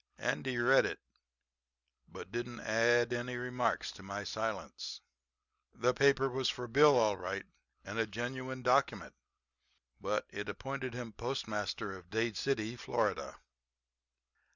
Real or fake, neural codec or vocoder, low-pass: real; none; 7.2 kHz